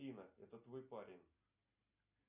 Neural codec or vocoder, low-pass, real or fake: none; 3.6 kHz; real